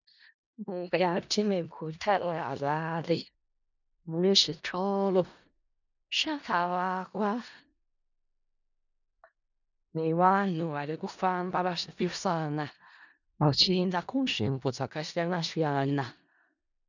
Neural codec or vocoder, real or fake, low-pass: codec, 16 kHz in and 24 kHz out, 0.4 kbps, LongCat-Audio-Codec, four codebook decoder; fake; 7.2 kHz